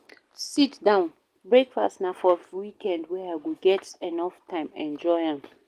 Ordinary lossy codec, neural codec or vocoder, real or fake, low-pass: Opus, 24 kbps; none; real; 14.4 kHz